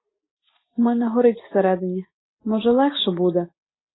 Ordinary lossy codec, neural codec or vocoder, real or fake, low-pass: AAC, 16 kbps; none; real; 7.2 kHz